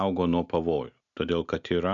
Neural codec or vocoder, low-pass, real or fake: none; 7.2 kHz; real